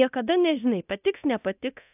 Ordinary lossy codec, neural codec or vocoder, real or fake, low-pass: AAC, 32 kbps; codec, 24 kHz, 0.9 kbps, DualCodec; fake; 3.6 kHz